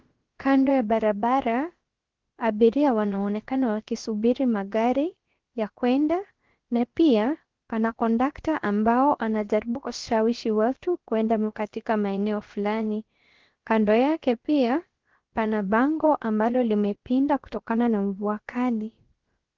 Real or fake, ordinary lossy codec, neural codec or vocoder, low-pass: fake; Opus, 16 kbps; codec, 16 kHz, about 1 kbps, DyCAST, with the encoder's durations; 7.2 kHz